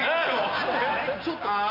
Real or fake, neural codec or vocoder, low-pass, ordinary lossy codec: real; none; 5.4 kHz; none